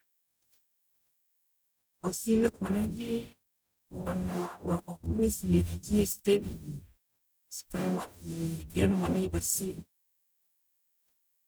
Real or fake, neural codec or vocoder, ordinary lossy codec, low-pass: fake; codec, 44.1 kHz, 0.9 kbps, DAC; none; none